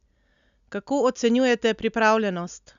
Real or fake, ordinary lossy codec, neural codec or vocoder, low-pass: real; none; none; 7.2 kHz